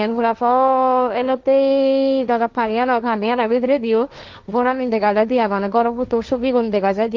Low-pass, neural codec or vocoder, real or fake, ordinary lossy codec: 7.2 kHz; codec, 16 kHz, 1.1 kbps, Voila-Tokenizer; fake; Opus, 32 kbps